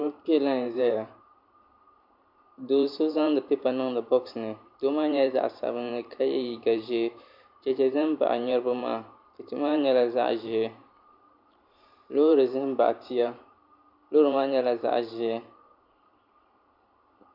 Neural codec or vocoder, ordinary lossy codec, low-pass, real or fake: vocoder, 44.1 kHz, 128 mel bands every 512 samples, BigVGAN v2; MP3, 48 kbps; 5.4 kHz; fake